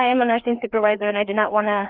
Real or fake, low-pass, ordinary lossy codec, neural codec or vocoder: fake; 5.4 kHz; Opus, 24 kbps; codec, 16 kHz, 2 kbps, FreqCodec, larger model